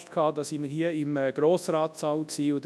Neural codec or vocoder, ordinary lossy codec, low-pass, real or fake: codec, 24 kHz, 0.9 kbps, WavTokenizer, large speech release; none; none; fake